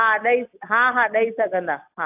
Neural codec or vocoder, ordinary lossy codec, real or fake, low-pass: none; AAC, 32 kbps; real; 3.6 kHz